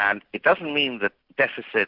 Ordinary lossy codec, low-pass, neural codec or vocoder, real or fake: AAC, 48 kbps; 5.4 kHz; none; real